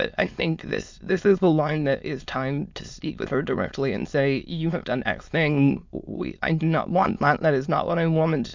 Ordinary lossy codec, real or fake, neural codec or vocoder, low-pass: MP3, 64 kbps; fake; autoencoder, 22.05 kHz, a latent of 192 numbers a frame, VITS, trained on many speakers; 7.2 kHz